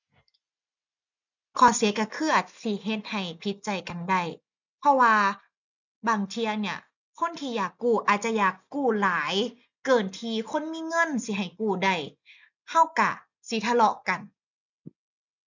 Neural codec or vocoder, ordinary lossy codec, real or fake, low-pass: none; none; real; 7.2 kHz